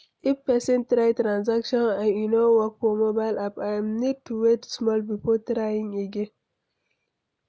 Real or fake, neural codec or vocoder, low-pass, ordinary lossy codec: real; none; none; none